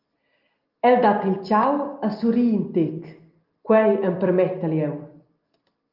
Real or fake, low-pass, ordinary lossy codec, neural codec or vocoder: real; 5.4 kHz; Opus, 24 kbps; none